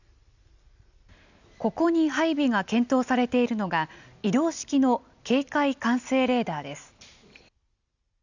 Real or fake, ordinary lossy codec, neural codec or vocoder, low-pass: real; none; none; 7.2 kHz